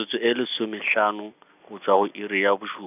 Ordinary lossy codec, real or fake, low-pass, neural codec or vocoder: none; real; 3.6 kHz; none